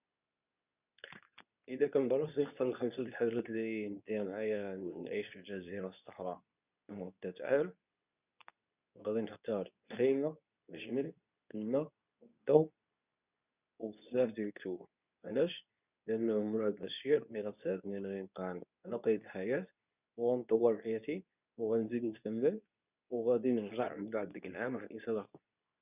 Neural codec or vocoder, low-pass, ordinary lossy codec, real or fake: codec, 24 kHz, 0.9 kbps, WavTokenizer, medium speech release version 2; 3.6 kHz; none; fake